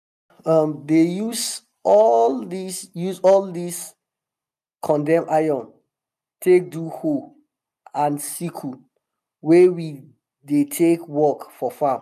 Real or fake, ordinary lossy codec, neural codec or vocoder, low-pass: real; none; none; 14.4 kHz